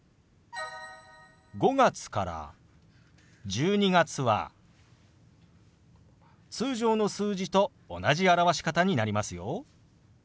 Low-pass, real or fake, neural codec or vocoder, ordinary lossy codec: none; real; none; none